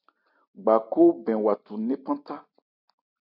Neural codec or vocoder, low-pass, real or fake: none; 5.4 kHz; real